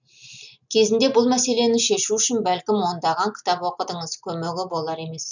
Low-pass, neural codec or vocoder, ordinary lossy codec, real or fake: 7.2 kHz; none; none; real